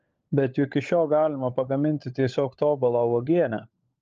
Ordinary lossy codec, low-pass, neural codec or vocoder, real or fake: Opus, 24 kbps; 7.2 kHz; codec, 16 kHz, 16 kbps, FunCodec, trained on LibriTTS, 50 frames a second; fake